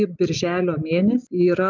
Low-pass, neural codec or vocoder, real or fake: 7.2 kHz; none; real